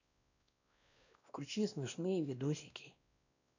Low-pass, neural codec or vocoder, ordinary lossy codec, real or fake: 7.2 kHz; codec, 16 kHz, 1 kbps, X-Codec, WavLM features, trained on Multilingual LibriSpeech; none; fake